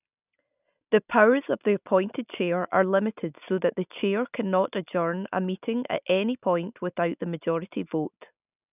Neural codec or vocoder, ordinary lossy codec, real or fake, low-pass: none; none; real; 3.6 kHz